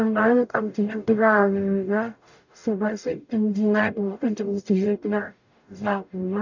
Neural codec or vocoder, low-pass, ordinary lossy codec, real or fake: codec, 44.1 kHz, 0.9 kbps, DAC; 7.2 kHz; none; fake